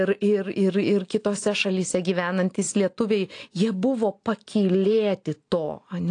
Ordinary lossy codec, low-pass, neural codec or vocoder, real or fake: AAC, 48 kbps; 9.9 kHz; none; real